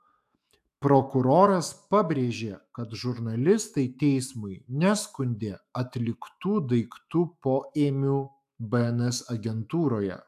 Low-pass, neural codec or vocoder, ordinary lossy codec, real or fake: 14.4 kHz; autoencoder, 48 kHz, 128 numbers a frame, DAC-VAE, trained on Japanese speech; AAC, 96 kbps; fake